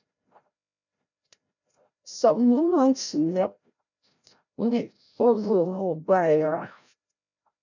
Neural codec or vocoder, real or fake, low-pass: codec, 16 kHz, 0.5 kbps, FreqCodec, larger model; fake; 7.2 kHz